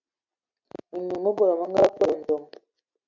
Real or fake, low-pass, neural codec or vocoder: real; 7.2 kHz; none